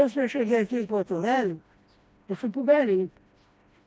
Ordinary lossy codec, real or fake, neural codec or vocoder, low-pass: none; fake; codec, 16 kHz, 1 kbps, FreqCodec, smaller model; none